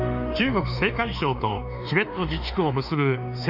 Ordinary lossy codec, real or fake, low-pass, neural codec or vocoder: none; fake; 5.4 kHz; autoencoder, 48 kHz, 32 numbers a frame, DAC-VAE, trained on Japanese speech